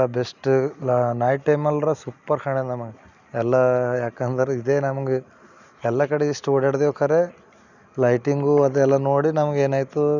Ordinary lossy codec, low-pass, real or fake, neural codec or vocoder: none; 7.2 kHz; real; none